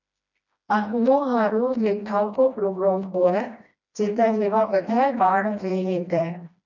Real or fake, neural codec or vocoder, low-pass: fake; codec, 16 kHz, 1 kbps, FreqCodec, smaller model; 7.2 kHz